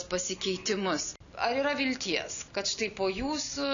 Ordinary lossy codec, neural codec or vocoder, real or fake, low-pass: MP3, 96 kbps; none; real; 7.2 kHz